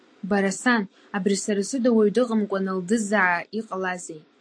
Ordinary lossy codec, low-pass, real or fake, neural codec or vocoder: AAC, 48 kbps; 9.9 kHz; real; none